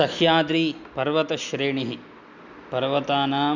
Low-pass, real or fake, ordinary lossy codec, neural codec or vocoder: 7.2 kHz; real; none; none